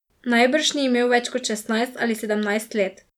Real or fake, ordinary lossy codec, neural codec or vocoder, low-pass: real; none; none; 19.8 kHz